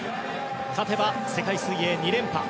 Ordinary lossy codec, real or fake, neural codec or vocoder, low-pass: none; real; none; none